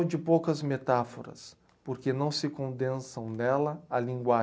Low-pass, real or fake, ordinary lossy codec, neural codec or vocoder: none; real; none; none